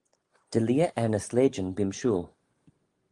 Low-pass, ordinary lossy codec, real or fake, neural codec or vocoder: 10.8 kHz; Opus, 24 kbps; real; none